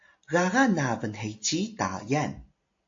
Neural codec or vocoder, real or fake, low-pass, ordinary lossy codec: none; real; 7.2 kHz; AAC, 48 kbps